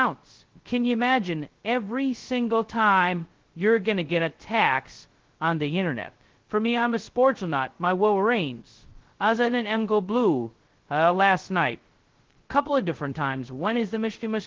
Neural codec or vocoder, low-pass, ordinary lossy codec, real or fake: codec, 16 kHz, 0.2 kbps, FocalCodec; 7.2 kHz; Opus, 16 kbps; fake